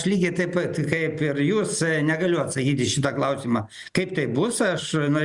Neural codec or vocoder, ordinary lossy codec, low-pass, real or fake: none; Opus, 64 kbps; 10.8 kHz; real